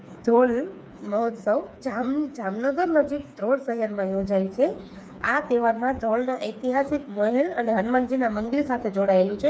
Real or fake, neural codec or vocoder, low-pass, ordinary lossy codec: fake; codec, 16 kHz, 4 kbps, FreqCodec, smaller model; none; none